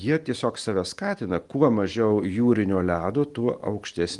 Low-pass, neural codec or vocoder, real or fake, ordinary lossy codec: 10.8 kHz; none; real; Opus, 24 kbps